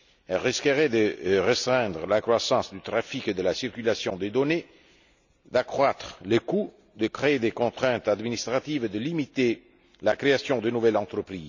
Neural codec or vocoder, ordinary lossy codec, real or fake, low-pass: none; none; real; 7.2 kHz